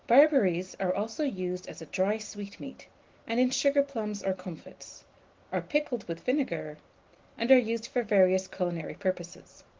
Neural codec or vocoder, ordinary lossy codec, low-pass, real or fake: none; Opus, 16 kbps; 7.2 kHz; real